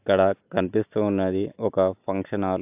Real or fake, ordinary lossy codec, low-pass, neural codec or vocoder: real; AAC, 32 kbps; 3.6 kHz; none